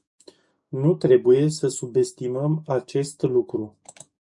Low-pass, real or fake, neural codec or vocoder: 10.8 kHz; fake; codec, 44.1 kHz, 7.8 kbps, DAC